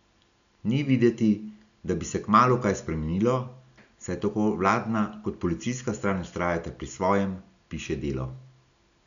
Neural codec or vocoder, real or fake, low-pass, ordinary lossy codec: none; real; 7.2 kHz; none